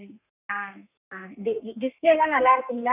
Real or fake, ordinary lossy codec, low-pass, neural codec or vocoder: fake; none; 3.6 kHz; codec, 32 kHz, 1.9 kbps, SNAC